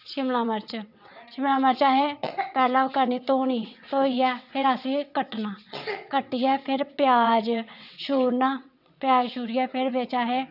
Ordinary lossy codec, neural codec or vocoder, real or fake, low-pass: none; vocoder, 22.05 kHz, 80 mel bands, WaveNeXt; fake; 5.4 kHz